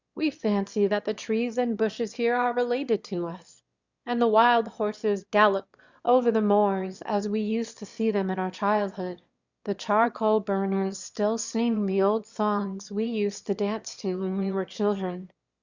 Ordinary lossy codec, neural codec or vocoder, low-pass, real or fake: Opus, 64 kbps; autoencoder, 22.05 kHz, a latent of 192 numbers a frame, VITS, trained on one speaker; 7.2 kHz; fake